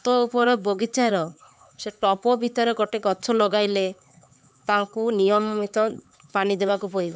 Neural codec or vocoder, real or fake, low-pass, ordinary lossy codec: codec, 16 kHz, 4 kbps, X-Codec, HuBERT features, trained on LibriSpeech; fake; none; none